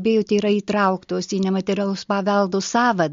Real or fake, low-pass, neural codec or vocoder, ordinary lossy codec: real; 7.2 kHz; none; MP3, 48 kbps